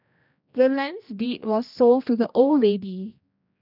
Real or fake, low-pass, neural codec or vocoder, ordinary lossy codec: fake; 5.4 kHz; codec, 16 kHz, 1 kbps, X-Codec, HuBERT features, trained on general audio; none